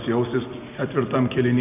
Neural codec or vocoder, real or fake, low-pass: none; real; 3.6 kHz